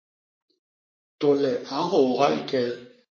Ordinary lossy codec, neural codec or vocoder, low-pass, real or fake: MP3, 32 kbps; codec, 44.1 kHz, 3.4 kbps, Pupu-Codec; 7.2 kHz; fake